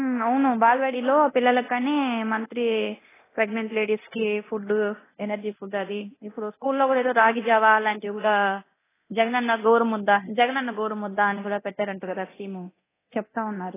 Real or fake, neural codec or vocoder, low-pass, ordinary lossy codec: fake; codec, 24 kHz, 0.9 kbps, DualCodec; 3.6 kHz; AAC, 16 kbps